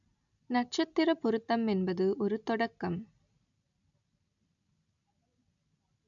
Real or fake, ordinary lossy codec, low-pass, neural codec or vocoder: real; none; 7.2 kHz; none